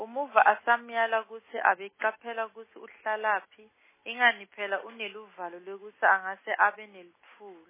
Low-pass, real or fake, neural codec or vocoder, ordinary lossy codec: 3.6 kHz; real; none; MP3, 16 kbps